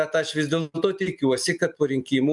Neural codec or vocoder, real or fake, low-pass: none; real; 10.8 kHz